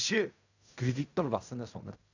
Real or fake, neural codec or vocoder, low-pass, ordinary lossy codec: fake; codec, 16 kHz in and 24 kHz out, 0.4 kbps, LongCat-Audio-Codec, fine tuned four codebook decoder; 7.2 kHz; none